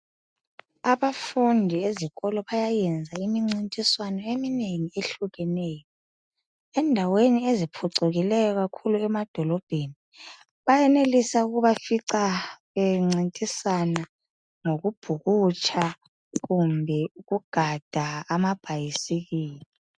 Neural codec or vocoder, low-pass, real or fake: none; 9.9 kHz; real